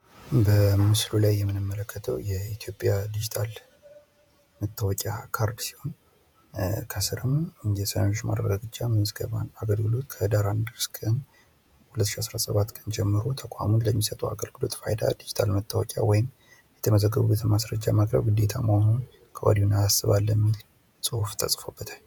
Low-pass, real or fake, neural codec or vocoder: 19.8 kHz; fake; vocoder, 48 kHz, 128 mel bands, Vocos